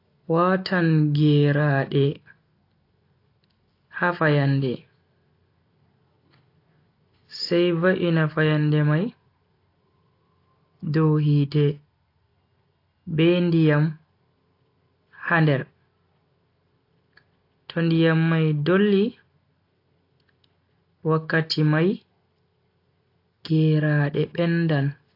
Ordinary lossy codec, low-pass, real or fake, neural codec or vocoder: AAC, 32 kbps; 5.4 kHz; real; none